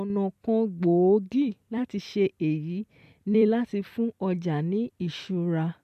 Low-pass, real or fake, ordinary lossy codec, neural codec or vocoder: 14.4 kHz; fake; MP3, 96 kbps; vocoder, 44.1 kHz, 128 mel bands every 256 samples, BigVGAN v2